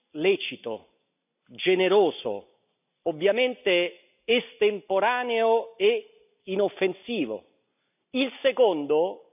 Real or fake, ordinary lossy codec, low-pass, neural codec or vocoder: real; none; 3.6 kHz; none